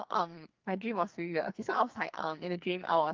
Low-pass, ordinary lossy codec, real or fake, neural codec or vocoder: 7.2 kHz; Opus, 24 kbps; fake; codec, 44.1 kHz, 2.6 kbps, SNAC